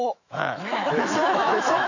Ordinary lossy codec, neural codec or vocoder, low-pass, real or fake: none; autoencoder, 48 kHz, 128 numbers a frame, DAC-VAE, trained on Japanese speech; 7.2 kHz; fake